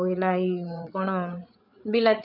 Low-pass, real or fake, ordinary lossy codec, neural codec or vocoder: 5.4 kHz; fake; none; codec, 16 kHz, 8 kbps, FreqCodec, larger model